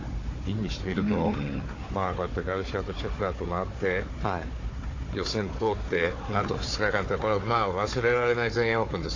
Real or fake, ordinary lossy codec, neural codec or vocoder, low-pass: fake; AAC, 32 kbps; codec, 16 kHz, 4 kbps, FunCodec, trained on Chinese and English, 50 frames a second; 7.2 kHz